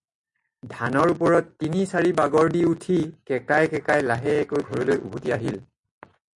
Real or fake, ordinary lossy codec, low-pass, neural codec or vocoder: real; MP3, 48 kbps; 10.8 kHz; none